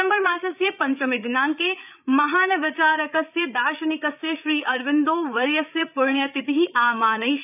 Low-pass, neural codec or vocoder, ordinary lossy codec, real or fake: 3.6 kHz; codec, 16 kHz, 8 kbps, FreqCodec, larger model; none; fake